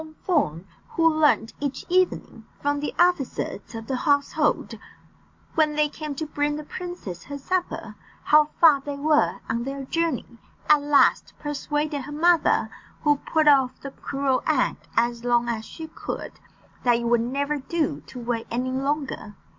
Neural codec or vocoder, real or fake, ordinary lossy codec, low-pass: none; real; MP3, 48 kbps; 7.2 kHz